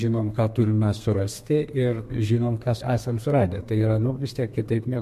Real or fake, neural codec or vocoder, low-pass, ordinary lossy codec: fake; codec, 32 kHz, 1.9 kbps, SNAC; 14.4 kHz; MP3, 64 kbps